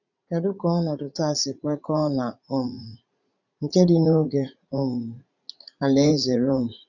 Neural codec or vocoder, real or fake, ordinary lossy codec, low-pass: vocoder, 44.1 kHz, 80 mel bands, Vocos; fake; none; 7.2 kHz